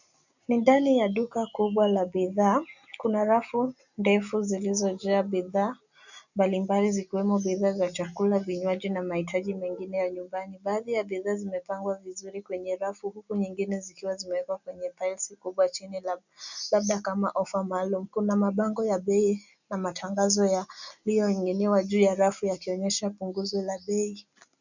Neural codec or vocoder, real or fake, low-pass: none; real; 7.2 kHz